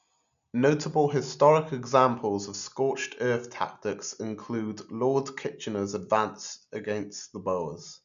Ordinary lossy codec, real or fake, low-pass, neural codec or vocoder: none; real; 7.2 kHz; none